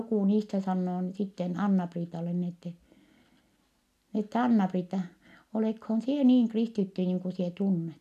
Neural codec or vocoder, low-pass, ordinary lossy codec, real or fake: none; 14.4 kHz; none; real